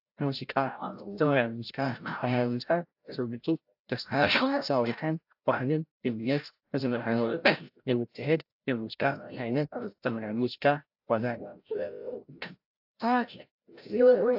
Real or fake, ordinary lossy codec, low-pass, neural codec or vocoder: fake; AAC, 48 kbps; 5.4 kHz; codec, 16 kHz, 0.5 kbps, FreqCodec, larger model